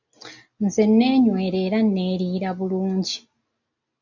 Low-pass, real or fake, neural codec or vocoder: 7.2 kHz; real; none